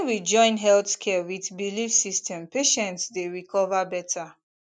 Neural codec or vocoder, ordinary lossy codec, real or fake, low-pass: none; none; real; none